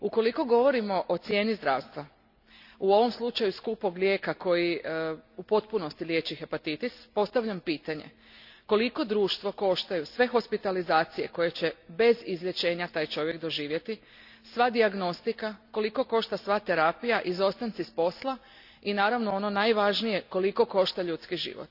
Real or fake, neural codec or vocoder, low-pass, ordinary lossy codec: real; none; 5.4 kHz; none